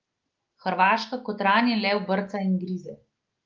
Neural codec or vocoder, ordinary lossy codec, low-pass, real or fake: none; Opus, 32 kbps; 7.2 kHz; real